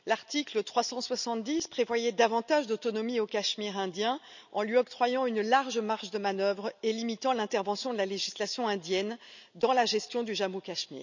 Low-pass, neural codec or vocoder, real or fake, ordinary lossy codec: 7.2 kHz; none; real; none